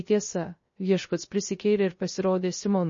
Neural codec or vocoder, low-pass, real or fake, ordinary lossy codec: codec, 16 kHz, 0.3 kbps, FocalCodec; 7.2 kHz; fake; MP3, 32 kbps